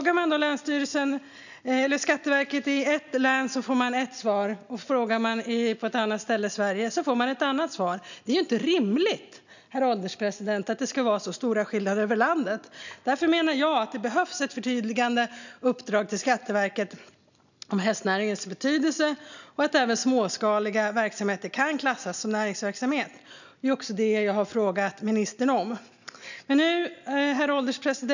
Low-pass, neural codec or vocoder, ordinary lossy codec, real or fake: 7.2 kHz; none; none; real